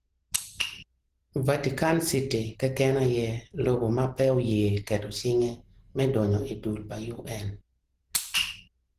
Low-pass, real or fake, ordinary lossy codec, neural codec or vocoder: 14.4 kHz; real; Opus, 16 kbps; none